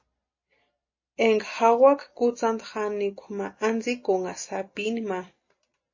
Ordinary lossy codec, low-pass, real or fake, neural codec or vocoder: MP3, 32 kbps; 7.2 kHz; real; none